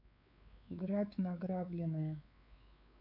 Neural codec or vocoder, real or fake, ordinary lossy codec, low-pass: codec, 16 kHz, 4 kbps, X-Codec, WavLM features, trained on Multilingual LibriSpeech; fake; none; 5.4 kHz